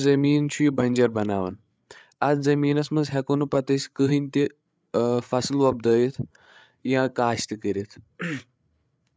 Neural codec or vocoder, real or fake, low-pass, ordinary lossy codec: codec, 16 kHz, 8 kbps, FreqCodec, larger model; fake; none; none